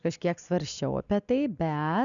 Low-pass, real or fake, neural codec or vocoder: 7.2 kHz; real; none